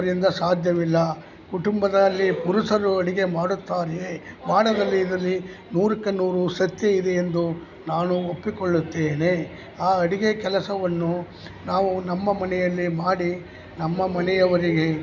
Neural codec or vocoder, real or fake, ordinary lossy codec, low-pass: none; real; none; 7.2 kHz